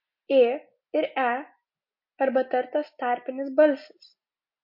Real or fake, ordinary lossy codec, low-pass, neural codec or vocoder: real; MP3, 32 kbps; 5.4 kHz; none